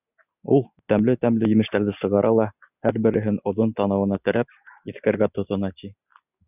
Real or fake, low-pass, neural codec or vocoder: real; 3.6 kHz; none